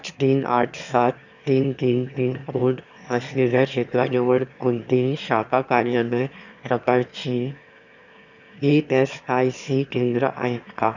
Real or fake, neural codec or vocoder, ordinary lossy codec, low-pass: fake; autoencoder, 22.05 kHz, a latent of 192 numbers a frame, VITS, trained on one speaker; none; 7.2 kHz